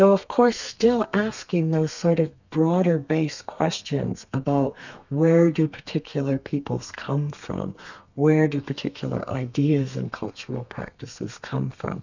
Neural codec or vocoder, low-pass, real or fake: codec, 32 kHz, 1.9 kbps, SNAC; 7.2 kHz; fake